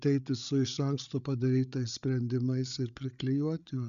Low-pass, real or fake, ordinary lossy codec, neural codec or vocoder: 7.2 kHz; fake; MP3, 96 kbps; codec, 16 kHz, 8 kbps, FreqCodec, larger model